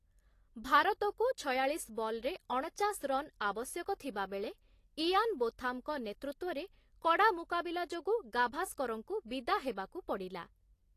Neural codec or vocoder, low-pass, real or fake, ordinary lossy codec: none; 14.4 kHz; real; AAC, 48 kbps